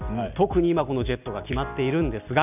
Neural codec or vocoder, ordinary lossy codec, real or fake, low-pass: none; none; real; 3.6 kHz